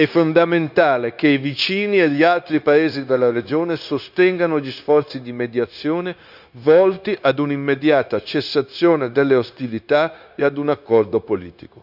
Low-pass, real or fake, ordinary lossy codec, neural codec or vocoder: 5.4 kHz; fake; none; codec, 16 kHz, 0.9 kbps, LongCat-Audio-Codec